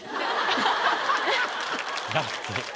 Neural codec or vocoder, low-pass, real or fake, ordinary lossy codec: none; none; real; none